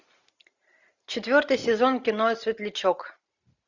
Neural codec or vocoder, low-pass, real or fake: none; 7.2 kHz; real